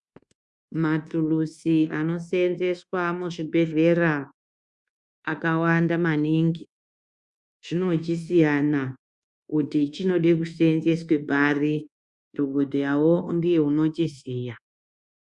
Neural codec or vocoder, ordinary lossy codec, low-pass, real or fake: codec, 24 kHz, 1.2 kbps, DualCodec; Opus, 64 kbps; 10.8 kHz; fake